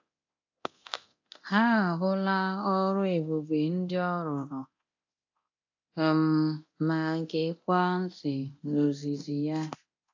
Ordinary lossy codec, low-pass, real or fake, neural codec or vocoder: AAC, 48 kbps; 7.2 kHz; fake; codec, 24 kHz, 0.9 kbps, DualCodec